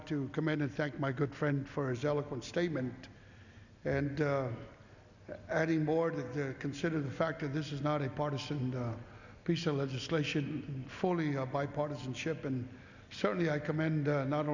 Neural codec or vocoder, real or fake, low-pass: none; real; 7.2 kHz